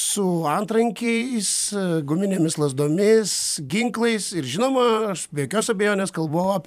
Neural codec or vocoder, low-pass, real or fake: vocoder, 44.1 kHz, 128 mel bands every 512 samples, BigVGAN v2; 14.4 kHz; fake